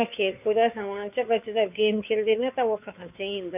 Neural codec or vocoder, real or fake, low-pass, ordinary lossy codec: codec, 16 kHz, 2 kbps, FunCodec, trained on Chinese and English, 25 frames a second; fake; 3.6 kHz; none